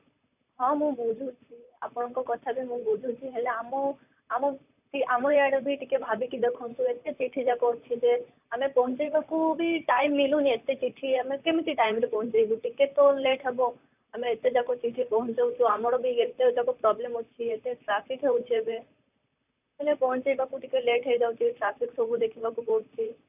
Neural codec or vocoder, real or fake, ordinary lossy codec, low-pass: vocoder, 44.1 kHz, 128 mel bands, Pupu-Vocoder; fake; none; 3.6 kHz